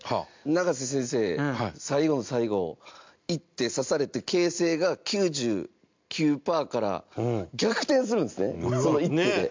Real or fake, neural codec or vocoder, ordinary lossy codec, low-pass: real; none; MP3, 64 kbps; 7.2 kHz